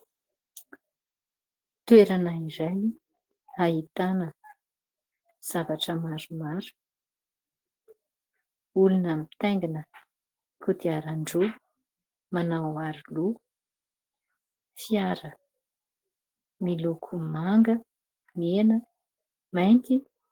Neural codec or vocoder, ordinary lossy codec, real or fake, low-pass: none; Opus, 16 kbps; real; 19.8 kHz